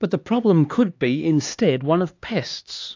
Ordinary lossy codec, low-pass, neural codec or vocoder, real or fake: AAC, 48 kbps; 7.2 kHz; codec, 16 kHz, 2 kbps, X-Codec, HuBERT features, trained on LibriSpeech; fake